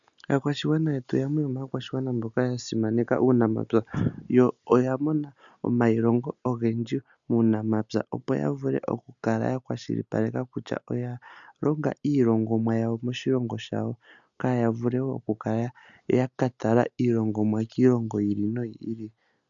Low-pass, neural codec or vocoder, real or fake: 7.2 kHz; none; real